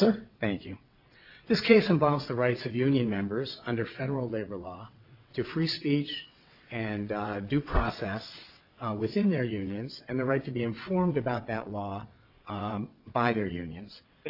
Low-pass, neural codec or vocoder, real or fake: 5.4 kHz; vocoder, 22.05 kHz, 80 mel bands, WaveNeXt; fake